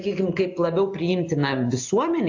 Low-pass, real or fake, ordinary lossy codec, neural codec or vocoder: 7.2 kHz; real; AAC, 48 kbps; none